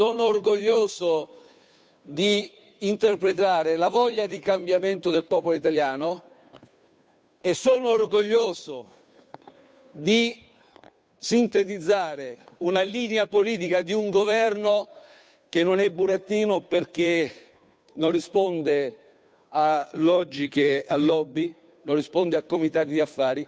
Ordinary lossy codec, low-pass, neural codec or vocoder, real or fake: none; none; codec, 16 kHz, 2 kbps, FunCodec, trained on Chinese and English, 25 frames a second; fake